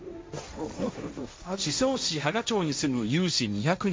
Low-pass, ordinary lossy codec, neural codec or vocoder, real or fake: none; none; codec, 16 kHz, 1.1 kbps, Voila-Tokenizer; fake